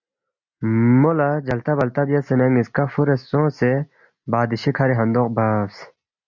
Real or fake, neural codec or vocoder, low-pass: real; none; 7.2 kHz